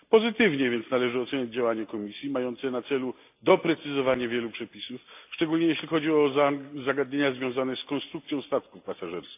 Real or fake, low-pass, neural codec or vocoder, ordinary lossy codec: real; 3.6 kHz; none; AAC, 32 kbps